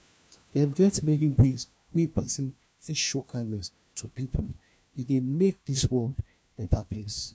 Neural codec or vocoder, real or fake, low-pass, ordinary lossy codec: codec, 16 kHz, 1 kbps, FunCodec, trained on LibriTTS, 50 frames a second; fake; none; none